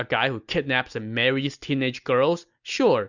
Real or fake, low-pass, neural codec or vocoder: real; 7.2 kHz; none